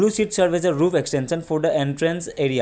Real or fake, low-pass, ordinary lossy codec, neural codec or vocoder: real; none; none; none